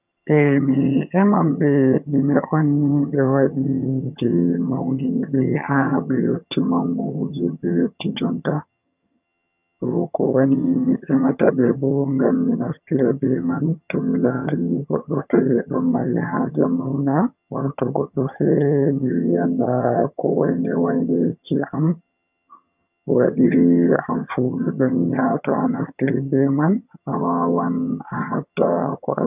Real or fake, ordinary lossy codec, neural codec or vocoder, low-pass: fake; none; vocoder, 22.05 kHz, 80 mel bands, HiFi-GAN; 3.6 kHz